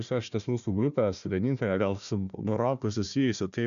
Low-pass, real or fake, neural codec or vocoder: 7.2 kHz; fake; codec, 16 kHz, 1 kbps, FunCodec, trained on Chinese and English, 50 frames a second